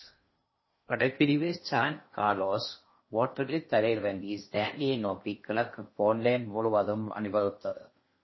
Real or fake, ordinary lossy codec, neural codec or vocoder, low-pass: fake; MP3, 24 kbps; codec, 16 kHz in and 24 kHz out, 0.6 kbps, FocalCodec, streaming, 2048 codes; 7.2 kHz